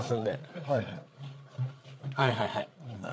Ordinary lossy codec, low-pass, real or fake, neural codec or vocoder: none; none; fake; codec, 16 kHz, 8 kbps, FreqCodec, larger model